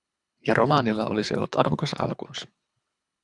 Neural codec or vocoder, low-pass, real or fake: codec, 24 kHz, 3 kbps, HILCodec; 10.8 kHz; fake